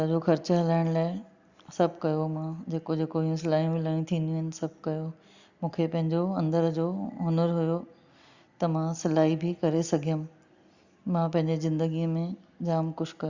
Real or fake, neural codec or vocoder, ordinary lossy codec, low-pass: real; none; Opus, 64 kbps; 7.2 kHz